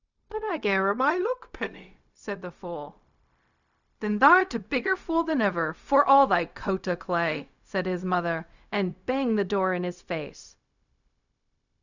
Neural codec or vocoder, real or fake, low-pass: codec, 16 kHz, 0.4 kbps, LongCat-Audio-Codec; fake; 7.2 kHz